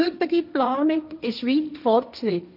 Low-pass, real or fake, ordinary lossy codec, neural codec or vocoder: 5.4 kHz; fake; none; codec, 16 kHz, 1.1 kbps, Voila-Tokenizer